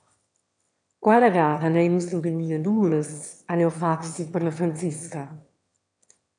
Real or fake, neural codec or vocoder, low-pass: fake; autoencoder, 22.05 kHz, a latent of 192 numbers a frame, VITS, trained on one speaker; 9.9 kHz